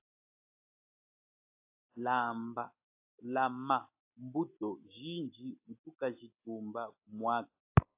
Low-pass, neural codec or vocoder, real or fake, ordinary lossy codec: 3.6 kHz; none; real; AAC, 32 kbps